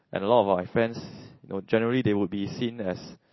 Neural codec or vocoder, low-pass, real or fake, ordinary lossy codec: none; 7.2 kHz; real; MP3, 24 kbps